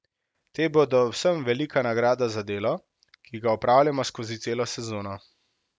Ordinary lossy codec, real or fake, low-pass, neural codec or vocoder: none; real; none; none